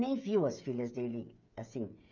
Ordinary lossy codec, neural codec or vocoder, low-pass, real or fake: none; codec, 16 kHz, 8 kbps, FreqCodec, smaller model; 7.2 kHz; fake